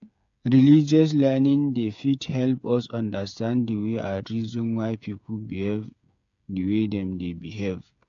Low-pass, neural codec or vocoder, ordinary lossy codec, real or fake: 7.2 kHz; codec, 16 kHz, 8 kbps, FreqCodec, smaller model; AAC, 64 kbps; fake